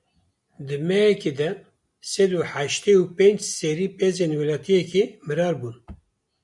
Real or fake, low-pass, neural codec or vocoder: real; 10.8 kHz; none